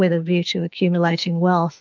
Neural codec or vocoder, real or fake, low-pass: autoencoder, 48 kHz, 32 numbers a frame, DAC-VAE, trained on Japanese speech; fake; 7.2 kHz